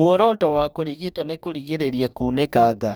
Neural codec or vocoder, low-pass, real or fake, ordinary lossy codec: codec, 44.1 kHz, 2.6 kbps, DAC; none; fake; none